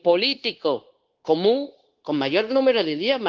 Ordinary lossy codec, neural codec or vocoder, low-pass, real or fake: Opus, 16 kbps; codec, 16 kHz, 0.9 kbps, LongCat-Audio-Codec; 7.2 kHz; fake